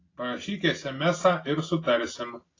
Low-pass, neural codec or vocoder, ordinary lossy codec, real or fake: 7.2 kHz; none; AAC, 32 kbps; real